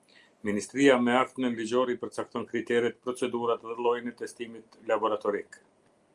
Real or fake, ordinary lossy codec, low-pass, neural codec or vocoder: real; Opus, 32 kbps; 10.8 kHz; none